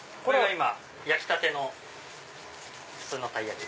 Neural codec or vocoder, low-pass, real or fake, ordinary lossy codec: none; none; real; none